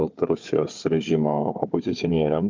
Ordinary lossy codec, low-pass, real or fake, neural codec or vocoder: Opus, 32 kbps; 7.2 kHz; fake; codec, 16 kHz, 4 kbps, FunCodec, trained on Chinese and English, 50 frames a second